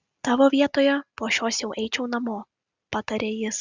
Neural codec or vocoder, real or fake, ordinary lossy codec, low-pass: none; real; Opus, 64 kbps; 7.2 kHz